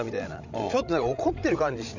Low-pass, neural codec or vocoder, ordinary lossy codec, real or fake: 7.2 kHz; codec, 16 kHz, 16 kbps, FreqCodec, larger model; none; fake